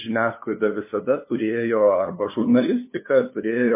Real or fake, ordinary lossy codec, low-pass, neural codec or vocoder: fake; MP3, 24 kbps; 3.6 kHz; codec, 16 kHz, 2 kbps, FunCodec, trained on LibriTTS, 25 frames a second